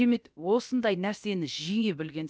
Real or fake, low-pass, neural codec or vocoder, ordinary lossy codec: fake; none; codec, 16 kHz, about 1 kbps, DyCAST, with the encoder's durations; none